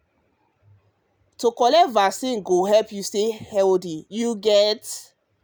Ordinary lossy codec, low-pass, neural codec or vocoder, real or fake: none; none; none; real